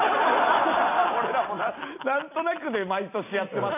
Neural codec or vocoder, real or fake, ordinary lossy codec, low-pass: none; real; none; 3.6 kHz